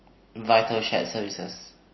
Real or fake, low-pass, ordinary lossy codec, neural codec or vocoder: real; 7.2 kHz; MP3, 24 kbps; none